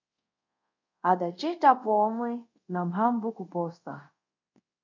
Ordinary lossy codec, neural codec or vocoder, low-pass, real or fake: MP3, 48 kbps; codec, 24 kHz, 0.5 kbps, DualCodec; 7.2 kHz; fake